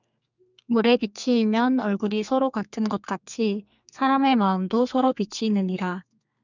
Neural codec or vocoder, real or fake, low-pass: codec, 32 kHz, 1.9 kbps, SNAC; fake; 7.2 kHz